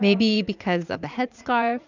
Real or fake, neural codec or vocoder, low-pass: fake; vocoder, 44.1 kHz, 80 mel bands, Vocos; 7.2 kHz